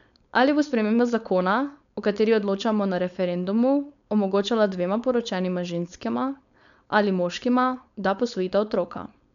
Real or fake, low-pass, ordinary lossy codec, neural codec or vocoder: fake; 7.2 kHz; none; codec, 16 kHz, 4.8 kbps, FACodec